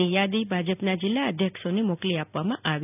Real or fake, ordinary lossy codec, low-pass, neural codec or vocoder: real; none; 3.6 kHz; none